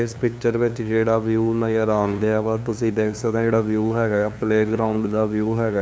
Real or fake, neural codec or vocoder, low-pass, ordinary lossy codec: fake; codec, 16 kHz, 2 kbps, FunCodec, trained on LibriTTS, 25 frames a second; none; none